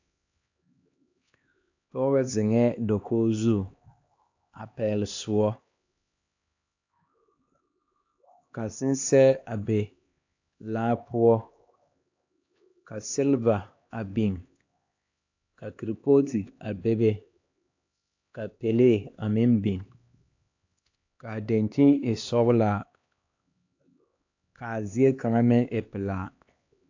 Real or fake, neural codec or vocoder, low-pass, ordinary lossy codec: fake; codec, 16 kHz, 2 kbps, X-Codec, HuBERT features, trained on LibriSpeech; 7.2 kHz; AAC, 48 kbps